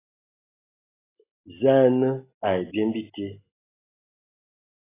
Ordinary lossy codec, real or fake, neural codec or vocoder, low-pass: AAC, 16 kbps; real; none; 3.6 kHz